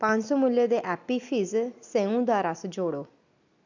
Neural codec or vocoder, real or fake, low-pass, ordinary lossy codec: none; real; 7.2 kHz; none